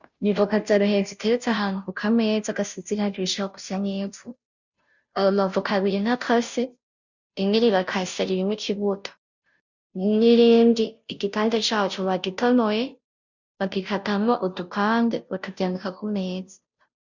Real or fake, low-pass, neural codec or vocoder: fake; 7.2 kHz; codec, 16 kHz, 0.5 kbps, FunCodec, trained on Chinese and English, 25 frames a second